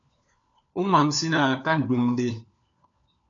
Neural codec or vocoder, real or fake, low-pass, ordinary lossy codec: codec, 16 kHz, 4 kbps, FunCodec, trained on LibriTTS, 50 frames a second; fake; 7.2 kHz; AAC, 64 kbps